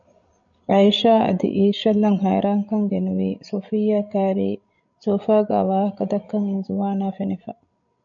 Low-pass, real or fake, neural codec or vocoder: 7.2 kHz; fake; codec, 16 kHz, 16 kbps, FreqCodec, larger model